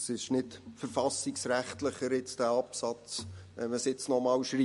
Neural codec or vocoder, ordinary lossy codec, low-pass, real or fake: none; MP3, 48 kbps; 14.4 kHz; real